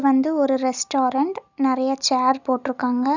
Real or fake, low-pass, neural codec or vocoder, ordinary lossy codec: real; 7.2 kHz; none; none